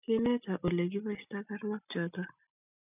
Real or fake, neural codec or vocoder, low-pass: real; none; 3.6 kHz